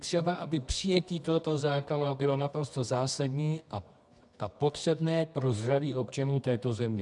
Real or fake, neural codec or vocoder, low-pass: fake; codec, 24 kHz, 0.9 kbps, WavTokenizer, medium music audio release; 10.8 kHz